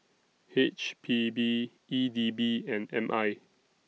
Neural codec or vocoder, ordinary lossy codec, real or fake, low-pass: none; none; real; none